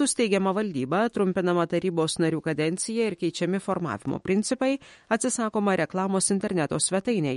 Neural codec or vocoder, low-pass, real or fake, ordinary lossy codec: autoencoder, 48 kHz, 128 numbers a frame, DAC-VAE, trained on Japanese speech; 19.8 kHz; fake; MP3, 48 kbps